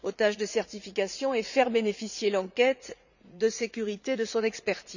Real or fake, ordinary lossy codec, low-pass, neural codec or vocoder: fake; none; 7.2 kHz; vocoder, 44.1 kHz, 80 mel bands, Vocos